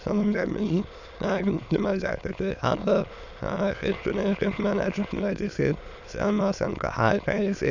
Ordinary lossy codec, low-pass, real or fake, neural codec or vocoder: none; 7.2 kHz; fake; autoencoder, 22.05 kHz, a latent of 192 numbers a frame, VITS, trained on many speakers